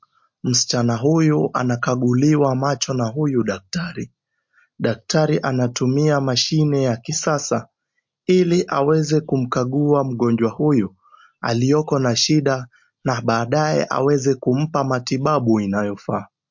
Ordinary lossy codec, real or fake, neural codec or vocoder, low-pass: MP3, 48 kbps; real; none; 7.2 kHz